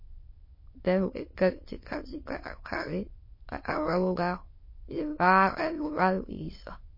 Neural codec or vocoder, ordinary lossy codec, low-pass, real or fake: autoencoder, 22.05 kHz, a latent of 192 numbers a frame, VITS, trained on many speakers; MP3, 24 kbps; 5.4 kHz; fake